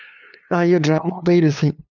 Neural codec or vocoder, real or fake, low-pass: codec, 16 kHz, 2 kbps, FunCodec, trained on LibriTTS, 25 frames a second; fake; 7.2 kHz